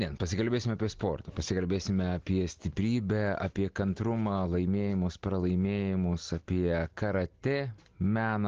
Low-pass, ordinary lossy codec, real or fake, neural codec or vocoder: 7.2 kHz; Opus, 16 kbps; real; none